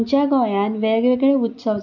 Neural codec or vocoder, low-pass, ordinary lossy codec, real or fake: none; 7.2 kHz; none; real